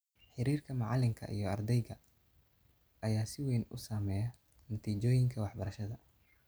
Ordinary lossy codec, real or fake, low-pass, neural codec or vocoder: none; real; none; none